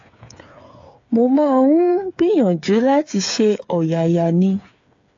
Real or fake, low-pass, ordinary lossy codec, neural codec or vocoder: fake; 7.2 kHz; AAC, 48 kbps; codec, 16 kHz, 8 kbps, FreqCodec, smaller model